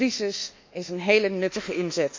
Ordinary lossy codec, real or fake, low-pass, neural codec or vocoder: MP3, 64 kbps; fake; 7.2 kHz; codec, 24 kHz, 1.2 kbps, DualCodec